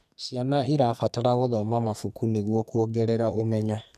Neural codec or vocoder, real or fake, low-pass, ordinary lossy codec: codec, 44.1 kHz, 2.6 kbps, SNAC; fake; 14.4 kHz; none